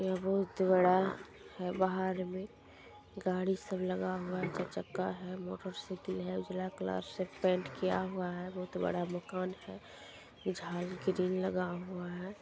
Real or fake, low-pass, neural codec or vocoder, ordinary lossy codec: real; none; none; none